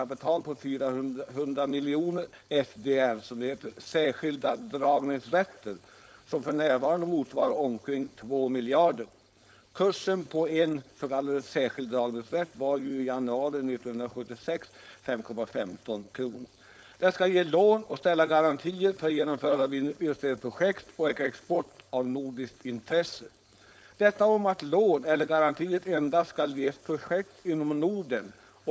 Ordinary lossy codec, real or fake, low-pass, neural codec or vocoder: none; fake; none; codec, 16 kHz, 4.8 kbps, FACodec